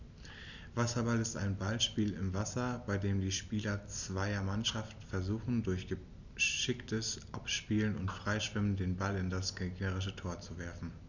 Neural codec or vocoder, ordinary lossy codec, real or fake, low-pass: none; none; real; 7.2 kHz